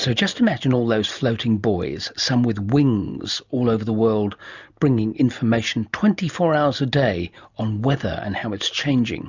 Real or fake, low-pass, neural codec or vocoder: real; 7.2 kHz; none